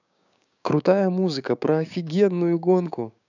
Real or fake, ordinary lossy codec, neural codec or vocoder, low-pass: fake; none; codec, 16 kHz, 6 kbps, DAC; 7.2 kHz